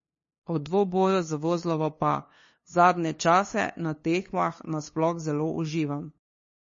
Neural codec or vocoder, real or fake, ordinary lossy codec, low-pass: codec, 16 kHz, 2 kbps, FunCodec, trained on LibriTTS, 25 frames a second; fake; MP3, 32 kbps; 7.2 kHz